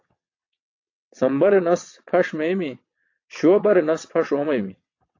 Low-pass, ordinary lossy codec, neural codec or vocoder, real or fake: 7.2 kHz; AAC, 48 kbps; vocoder, 22.05 kHz, 80 mel bands, WaveNeXt; fake